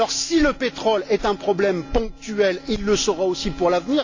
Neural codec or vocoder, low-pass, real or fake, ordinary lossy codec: none; 7.2 kHz; real; AAC, 48 kbps